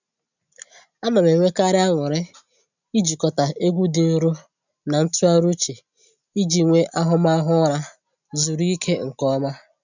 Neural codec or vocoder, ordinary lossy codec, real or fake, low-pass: none; none; real; 7.2 kHz